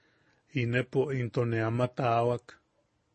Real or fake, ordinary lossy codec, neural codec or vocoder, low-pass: real; MP3, 32 kbps; none; 10.8 kHz